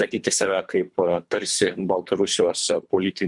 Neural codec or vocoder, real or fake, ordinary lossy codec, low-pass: codec, 24 kHz, 3 kbps, HILCodec; fake; MP3, 96 kbps; 10.8 kHz